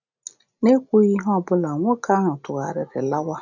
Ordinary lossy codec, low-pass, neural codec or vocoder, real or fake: none; 7.2 kHz; none; real